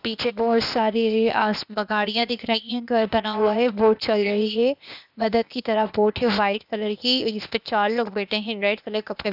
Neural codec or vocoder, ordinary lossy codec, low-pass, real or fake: codec, 16 kHz, 0.8 kbps, ZipCodec; none; 5.4 kHz; fake